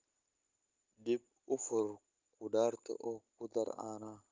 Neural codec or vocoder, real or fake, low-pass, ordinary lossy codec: none; real; 7.2 kHz; Opus, 32 kbps